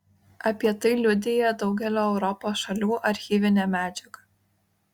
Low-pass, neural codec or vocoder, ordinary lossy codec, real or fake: 19.8 kHz; none; Opus, 64 kbps; real